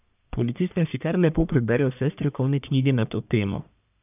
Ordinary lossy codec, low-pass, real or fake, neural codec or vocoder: none; 3.6 kHz; fake; codec, 44.1 kHz, 1.7 kbps, Pupu-Codec